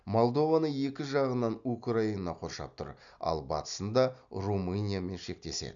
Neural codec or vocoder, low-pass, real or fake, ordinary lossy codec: none; 7.2 kHz; real; none